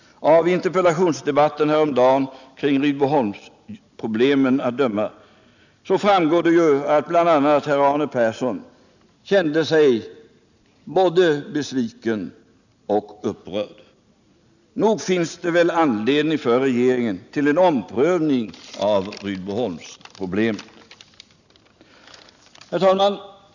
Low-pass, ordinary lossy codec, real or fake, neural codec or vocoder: 7.2 kHz; none; real; none